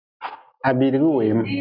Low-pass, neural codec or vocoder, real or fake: 5.4 kHz; none; real